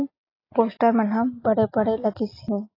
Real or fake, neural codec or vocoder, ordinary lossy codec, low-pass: real; none; AAC, 24 kbps; 5.4 kHz